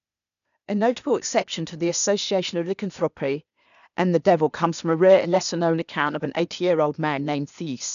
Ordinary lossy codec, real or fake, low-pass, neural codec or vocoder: none; fake; 7.2 kHz; codec, 16 kHz, 0.8 kbps, ZipCodec